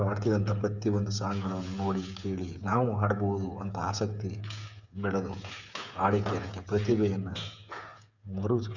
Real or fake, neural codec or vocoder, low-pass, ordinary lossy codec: fake; codec, 16 kHz, 8 kbps, FreqCodec, smaller model; 7.2 kHz; none